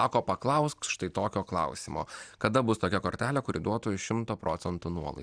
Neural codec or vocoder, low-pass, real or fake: none; 9.9 kHz; real